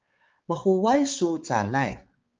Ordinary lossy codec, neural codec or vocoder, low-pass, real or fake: Opus, 24 kbps; codec, 16 kHz, 4 kbps, X-Codec, HuBERT features, trained on balanced general audio; 7.2 kHz; fake